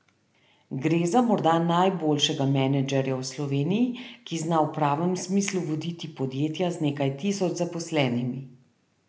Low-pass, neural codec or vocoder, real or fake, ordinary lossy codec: none; none; real; none